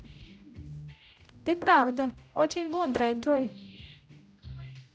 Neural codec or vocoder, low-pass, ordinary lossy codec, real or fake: codec, 16 kHz, 0.5 kbps, X-Codec, HuBERT features, trained on general audio; none; none; fake